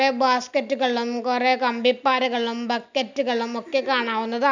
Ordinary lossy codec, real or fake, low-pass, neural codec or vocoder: none; real; 7.2 kHz; none